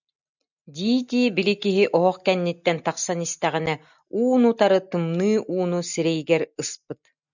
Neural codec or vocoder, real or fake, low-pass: none; real; 7.2 kHz